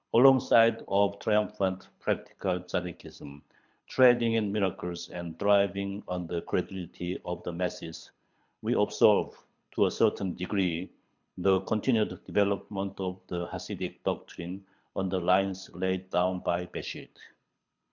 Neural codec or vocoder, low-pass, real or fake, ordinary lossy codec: codec, 24 kHz, 6 kbps, HILCodec; 7.2 kHz; fake; MP3, 64 kbps